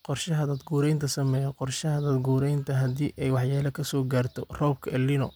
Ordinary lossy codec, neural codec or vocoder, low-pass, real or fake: none; none; none; real